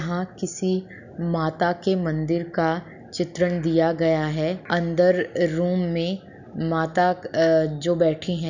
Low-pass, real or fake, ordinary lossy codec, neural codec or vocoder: 7.2 kHz; real; none; none